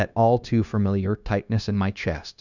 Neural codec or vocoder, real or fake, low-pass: codec, 16 kHz, 0.9 kbps, LongCat-Audio-Codec; fake; 7.2 kHz